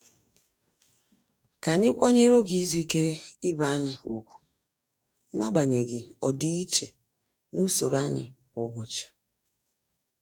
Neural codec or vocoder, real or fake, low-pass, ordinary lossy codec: codec, 44.1 kHz, 2.6 kbps, DAC; fake; none; none